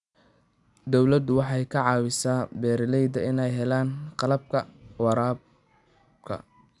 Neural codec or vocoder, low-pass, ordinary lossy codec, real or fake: none; 10.8 kHz; none; real